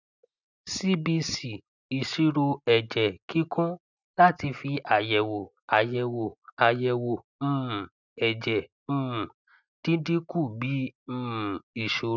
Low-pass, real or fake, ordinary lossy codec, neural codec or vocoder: 7.2 kHz; real; none; none